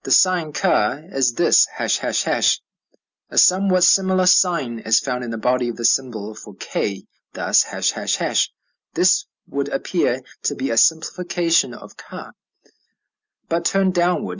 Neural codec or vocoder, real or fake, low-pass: none; real; 7.2 kHz